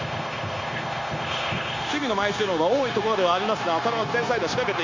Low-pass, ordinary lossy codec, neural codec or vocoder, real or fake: 7.2 kHz; AAC, 48 kbps; codec, 16 kHz, 0.9 kbps, LongCat-Audio-Codec; fake